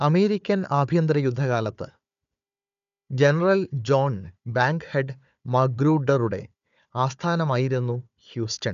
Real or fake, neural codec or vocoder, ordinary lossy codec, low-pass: fake; codec, 16 kHz, 4 kbps, FunCodec, trained on Chinese and English, 50 frames a second; none; 7.2 kHz